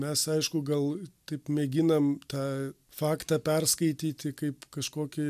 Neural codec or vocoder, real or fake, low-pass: none; real; 14.4 kHz